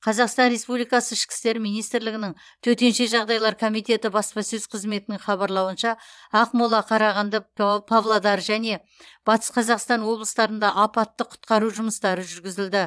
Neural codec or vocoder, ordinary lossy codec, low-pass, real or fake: vocoder, 22.05 kHz, 80 mel bands, Vocos; none; none; fake